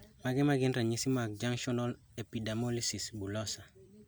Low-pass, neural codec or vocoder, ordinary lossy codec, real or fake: none; none; none; real